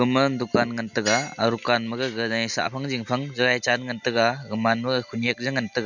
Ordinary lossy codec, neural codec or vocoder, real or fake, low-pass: none; none; real; 7.2 kHz